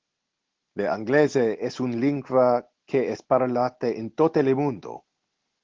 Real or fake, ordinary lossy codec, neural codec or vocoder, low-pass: real; Opus, 16 kbps; none; 7.2 kHz